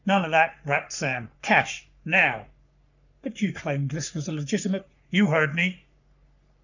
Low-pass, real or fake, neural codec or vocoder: 7.2 kHz; fake; codec, 44.1 kHz, 3.4 kbps, Pupu-Codec